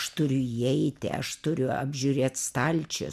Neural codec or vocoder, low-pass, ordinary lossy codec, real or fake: none; 14.4 kHz; MP3, 96 kbps; real